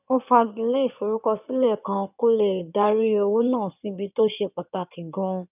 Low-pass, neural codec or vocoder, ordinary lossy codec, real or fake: 3.6 kHz; codec, 16 kHz in and 24 kHz out, 2.2 kbps, FireRedTTS-2 codec; none; fake